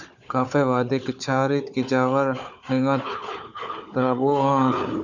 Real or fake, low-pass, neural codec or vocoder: fake; 7.2 kHz; codec, 16 kHz, 4 kbps, FunCodec, trained on Chinese and English, 50 frames a second